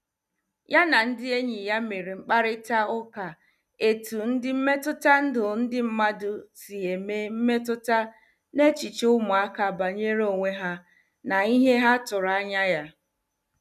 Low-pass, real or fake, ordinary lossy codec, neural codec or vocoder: 14.4 kHz; real; none; none